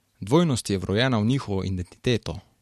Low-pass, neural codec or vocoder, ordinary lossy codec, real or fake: 14.4 kHz; none; MP3, 64 kbps; real